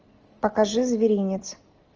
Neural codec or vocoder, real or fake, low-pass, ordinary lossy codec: none; real; 7.2 kHz; Opus, 24 kbps